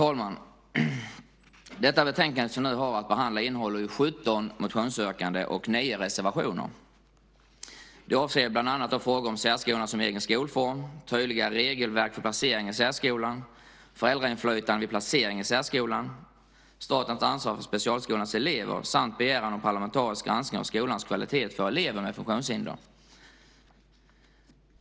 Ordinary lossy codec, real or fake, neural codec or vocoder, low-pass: none; real; none; none